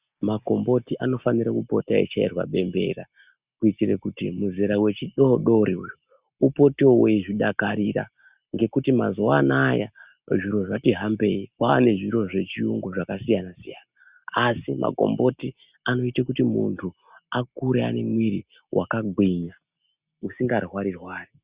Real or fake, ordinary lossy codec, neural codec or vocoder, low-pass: real; Opus, 64 kbps; none; 3.6 kHz